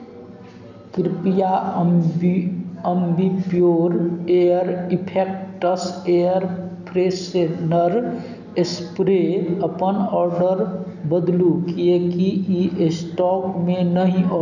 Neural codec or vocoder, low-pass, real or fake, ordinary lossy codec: none; 7.2 kHz; real; none